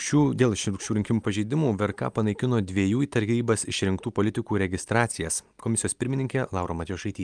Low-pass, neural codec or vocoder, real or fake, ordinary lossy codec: 9.9 kHz; none; real; Opus, 32 kbps